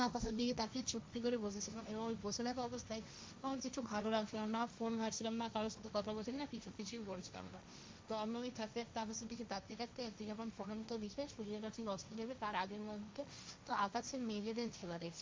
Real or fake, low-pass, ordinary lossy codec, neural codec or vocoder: fake; none; none; codec, 16 kHz, 1.1 kbps, Voila-Tokenizer